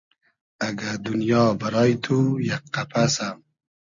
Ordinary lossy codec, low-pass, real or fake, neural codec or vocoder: MP3, 96 kbps; 7.2 kHz; real; none